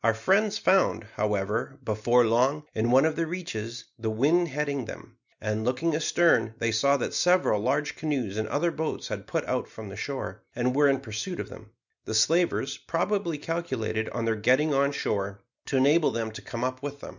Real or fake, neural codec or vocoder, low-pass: real; none; 7.2 kHz